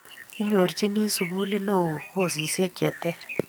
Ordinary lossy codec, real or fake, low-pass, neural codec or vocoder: none; fake; none; codec, 44.1 kHz, 2.6 kbps, SNAC